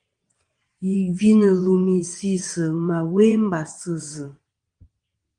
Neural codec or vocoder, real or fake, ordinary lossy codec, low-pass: vocoder, 22.05 kHz, 80 mel bands, WaveNeXt; fake; Opus, 24 kbps; 9.9 kHz